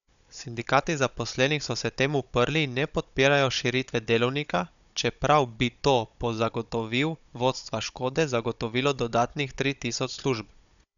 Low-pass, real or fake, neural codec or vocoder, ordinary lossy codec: 7.2 kHz; fake; codec, 16 kHz, 16 kbps, FunCodec, trained on Chinese and English, 50 frames a second; none